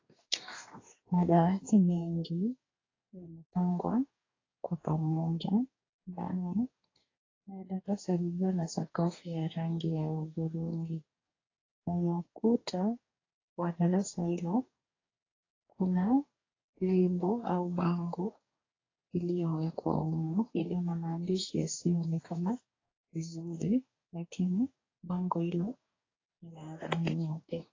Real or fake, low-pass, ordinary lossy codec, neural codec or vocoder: fake; 7.2 kHz; AAC, 32 kbps; codec, 44.1 kHz, 2.6 kbps, DAC